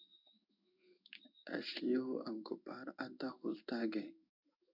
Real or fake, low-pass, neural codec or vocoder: fake; 5.4 kHz; codec, 16 kHz in and 24 kHz out, 1 kbps, XY-Tokenizer